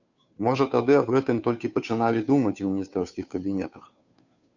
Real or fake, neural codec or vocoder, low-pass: fake; codec, 16 kHz, 2 kbps, FunCodec, trained on Chinese and English, 25 frames a second; 7.2 kHz